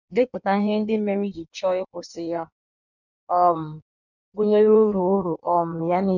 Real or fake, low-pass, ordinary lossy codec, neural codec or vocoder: fake; 7.2 kHz; none; codec, 16 kHz in and 24 kHz out, 1.1 kbps, FireRedTTS-2 codec